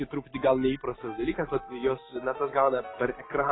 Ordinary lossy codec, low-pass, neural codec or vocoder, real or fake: AAC, 16 kbps; 7.2 kHz; none; real